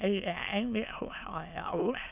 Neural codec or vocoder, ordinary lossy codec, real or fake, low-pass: autoencoder, 22.05 kHz, a latent of 192 numbers a frame, VITS, trained on many speakers; none; fake; 3.6 kHz